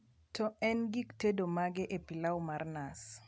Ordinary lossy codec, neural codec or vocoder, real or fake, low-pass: none; none; real; none